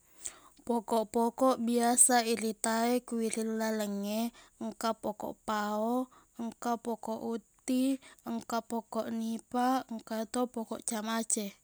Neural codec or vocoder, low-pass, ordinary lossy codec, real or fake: none; none; none; real